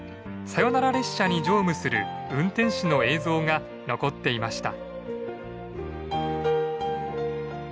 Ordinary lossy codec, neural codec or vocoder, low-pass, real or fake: none; none; none; real